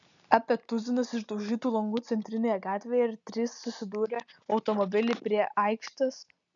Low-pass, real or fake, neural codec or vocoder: 7.2 kHz; real; none